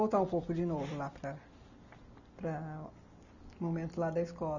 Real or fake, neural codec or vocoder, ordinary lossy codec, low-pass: real; none; none; 7.2 kHz